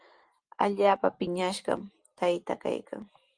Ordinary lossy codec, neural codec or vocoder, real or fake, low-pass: Opus, 24 kbps; none; real; 9.9 kHz